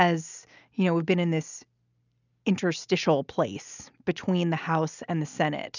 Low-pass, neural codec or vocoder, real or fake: 7.2 kHz; none; real